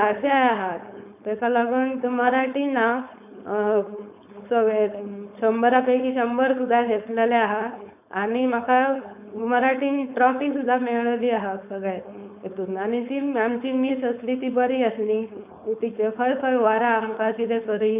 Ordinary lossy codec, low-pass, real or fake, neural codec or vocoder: none; 3.6 kHz; fake; codec, 16 kHz, 4.8 kbps, FACodec